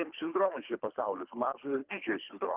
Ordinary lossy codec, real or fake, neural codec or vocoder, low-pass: Opus, 24 kbps; fake; codec, 24 kHz, 3 kbps, HILCodec; 3.6 kHz